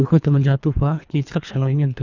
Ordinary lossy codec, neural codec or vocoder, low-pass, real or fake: none; codec, 24 kHz, 3 kbps, HILCodec; 7.2 kHz; fake